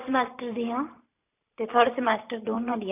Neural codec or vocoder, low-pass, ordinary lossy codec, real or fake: vocoder, 44.1 kHz, 128 mel bands every 512 samples, BigVGAN v2; 3.6 kHz; AAC, 24 kbps; fake